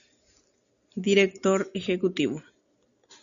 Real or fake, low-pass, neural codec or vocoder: real; 7.2 kHz; none